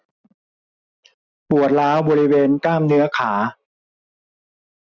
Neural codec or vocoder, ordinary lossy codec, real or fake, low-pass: none; none; real; 7.2 kHz